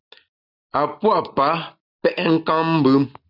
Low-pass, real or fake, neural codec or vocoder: 5.4 kHz; real; none